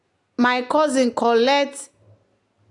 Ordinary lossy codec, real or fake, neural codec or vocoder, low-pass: AAC, 48 kbps; real; none; 10.8 kHz